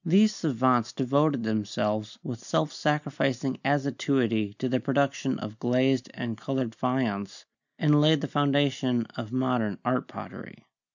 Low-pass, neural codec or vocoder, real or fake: 7.2 kHz; none; real